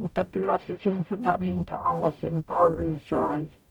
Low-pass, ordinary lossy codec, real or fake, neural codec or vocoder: 19.8 kHz; none; fake; codec, 44.1 kHz, 0.9 kbps, DAC